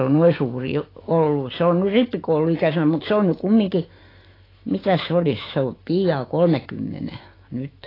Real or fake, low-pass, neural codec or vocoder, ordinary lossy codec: fake; 5.4 kHz; autoencoder, 48 kHz, 128 numbers a frame, DAC-VAE, trained on Japanese speech; AAC, 24 kbps